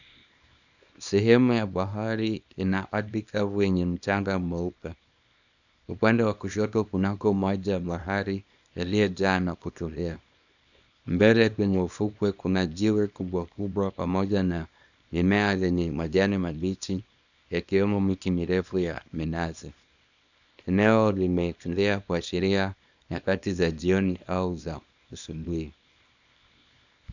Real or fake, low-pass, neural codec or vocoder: fake; 7.2 kHz; codec, 24 kHz, 0.9 kbps, WavTokenizer, small release